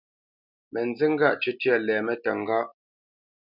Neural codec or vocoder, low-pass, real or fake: none; 5.4 kHz; real